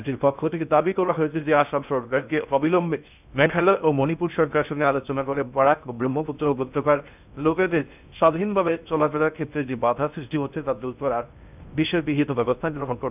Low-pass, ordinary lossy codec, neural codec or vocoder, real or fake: 3.6 kHz; none; codec, 16 kHz in and 24 kHz out, 0.6 kbps, FocalCodec, streaming, 2048 codes; fake